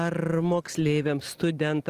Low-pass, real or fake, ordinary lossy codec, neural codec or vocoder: 14.4 kHz; real; Opus, 24 kbps; none